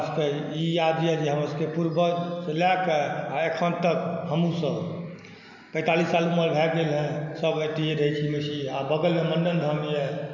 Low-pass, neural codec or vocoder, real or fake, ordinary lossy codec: 7.2 kHz; none; real; none